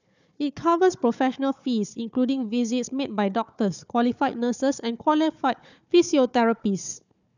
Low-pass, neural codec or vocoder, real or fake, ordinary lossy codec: 7.2 kHz; codec, 16 kHz, 4 kbps, FunCodec, trained on Chinese and English, 50 frames a second; fake; none